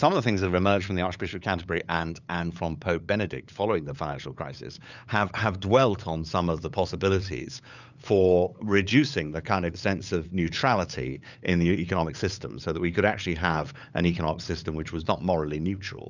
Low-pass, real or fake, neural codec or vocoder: 7.2 kHz; fake; codec, 16 kHz, 8 kbps, FunCodec, trained on LibriTTS, 25 frames a second